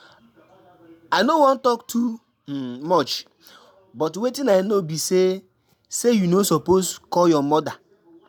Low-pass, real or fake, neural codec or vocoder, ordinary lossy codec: none; real; none; none